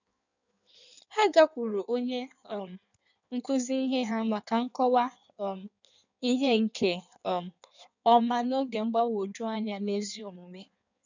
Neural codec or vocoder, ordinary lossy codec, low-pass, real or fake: codec, 16 kHz in and 24 kHz out, 1.1 kbps, FireRedTTS-2 codec; none; 7.2 kHz; fake